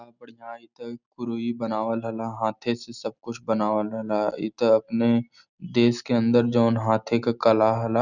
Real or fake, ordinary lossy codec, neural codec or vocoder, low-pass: real; none; none; 7.2 kHz